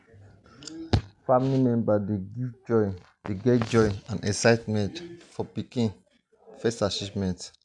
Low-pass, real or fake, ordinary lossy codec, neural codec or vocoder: 10.8 kHz; real; none; none